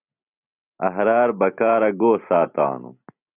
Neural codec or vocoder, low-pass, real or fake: none; 3.6 kHz; real